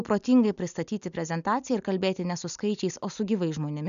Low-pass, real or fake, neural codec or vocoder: 7.2 kHz; real; none